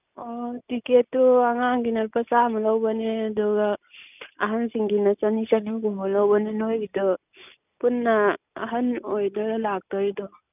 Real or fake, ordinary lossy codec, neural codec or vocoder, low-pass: real; none; none; 3.6 kHz